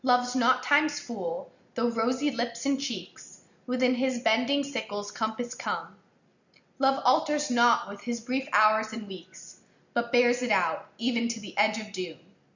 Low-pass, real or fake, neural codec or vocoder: 7.2 kHz; real; none